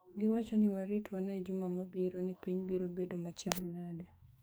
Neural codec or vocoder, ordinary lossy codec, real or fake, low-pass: codec, 44.1 kHz, 2.6 kbps, SNAC; none; fake; none